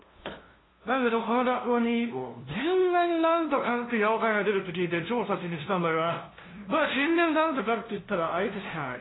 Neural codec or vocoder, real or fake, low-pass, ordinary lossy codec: codec, 16 kHz, 0.5 kbps, FunCodec, trained on LibriTTS, 25 frames a second; fake; 7.2 kHz; AAC, 16 kbps